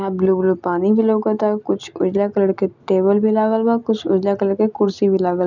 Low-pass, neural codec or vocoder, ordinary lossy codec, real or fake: 7.2 kHz; none; none; real